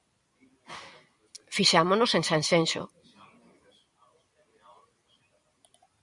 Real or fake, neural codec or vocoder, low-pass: fake; vocoder, 44.1 kHz, 128 mel bands every 256 samples, BigVGAN v2; 10.8 kHz